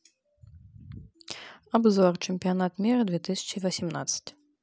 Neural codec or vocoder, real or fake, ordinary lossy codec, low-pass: none; real; none; none